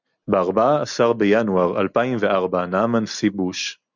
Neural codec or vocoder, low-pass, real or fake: none; 7.2 kHz; real